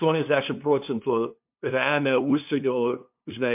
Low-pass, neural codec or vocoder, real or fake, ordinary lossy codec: 3.6 kHz; codec, 16 kHz, 2 kbps, FunCodec, trained on LibriTTS, 25 frames a second; fake; MP3, 32 kbps